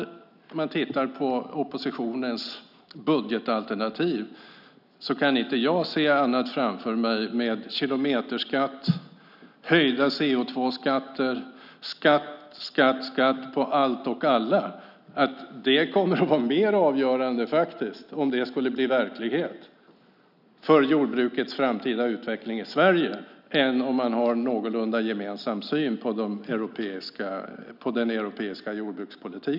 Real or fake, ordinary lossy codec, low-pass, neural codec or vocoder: real; none; 5.4 kHz; none